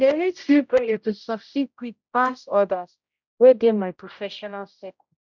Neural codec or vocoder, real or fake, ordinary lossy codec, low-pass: codec, 16 kHz, 0.5 kbps, X-Codec, HuBERT features, trained on general audio; fake; none; 7.2 kHz